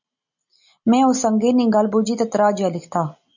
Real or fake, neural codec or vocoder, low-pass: real; none; 7.2 kHz